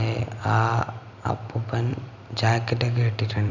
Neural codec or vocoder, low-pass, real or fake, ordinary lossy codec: vocoder, 44.1 kHz, 128 mel bands every 256 samples, BigVGAN v2; 7.2 kHz; fake; none